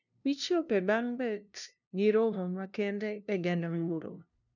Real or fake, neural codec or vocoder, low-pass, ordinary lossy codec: fake; codec, 16 kHz, 0.5 kbps, FunCodec, trained on LibriTTS, 25 frames a second; 7.2 kHz; none